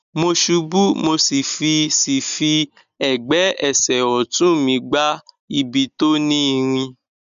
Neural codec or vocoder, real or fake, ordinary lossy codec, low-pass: none; real; none; 7.2 kHz